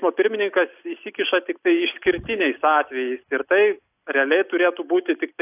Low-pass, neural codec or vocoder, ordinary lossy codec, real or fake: 3.6 kHz; none; AAC, 32 kbps; real